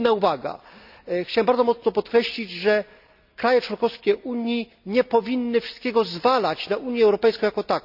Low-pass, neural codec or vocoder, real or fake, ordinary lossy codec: 5.4 kHz; none; real; none